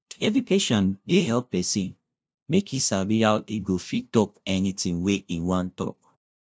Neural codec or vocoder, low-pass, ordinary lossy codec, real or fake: codec, 16 kHz, 0.5 kbps, FunCodec, trained on LibriTTS, 25 frames a second; none; none; fake